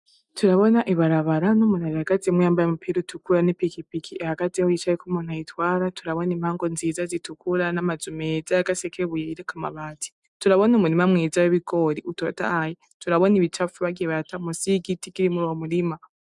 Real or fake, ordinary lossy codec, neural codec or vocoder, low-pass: real; MP3, 96 kbps; none; 10.8 kHz